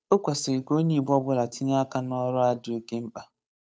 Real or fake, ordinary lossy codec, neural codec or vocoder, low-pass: fake; none; codec, 16 kHz, 8 kbps, FunCodec, trained on Chinese and English, 25 frames a second; none